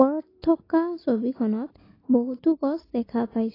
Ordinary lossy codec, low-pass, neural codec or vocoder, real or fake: AAC, 24 kbps; 5.4 kHz; none; real